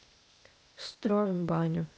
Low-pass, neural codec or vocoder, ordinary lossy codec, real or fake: none; codec, 16 kHz, 0.8 kbps, ZipCodec; none; fake